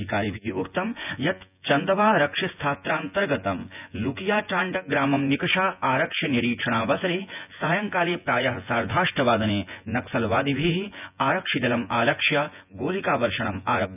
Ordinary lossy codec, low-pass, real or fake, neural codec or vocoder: none; 3.6 kHz; fake; vocoder, 24 kHz, 100 mel bands, Vocos